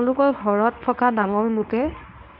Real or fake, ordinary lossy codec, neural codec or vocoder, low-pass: fake; none; codec, 24 kHz, 0.9 kbps, WavTokenizer, medium speech release version 1; 5.4 kHz